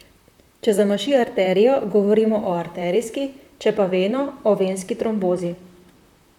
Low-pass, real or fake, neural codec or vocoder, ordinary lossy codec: 19.8 kHz; fake; vocoder, 44.1 kHz, 128 mel bands, Pupu-Vocoder; none